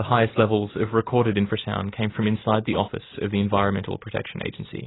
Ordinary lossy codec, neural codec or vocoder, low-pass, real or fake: AAC, 16 kbps; none; 7.2 kHz; real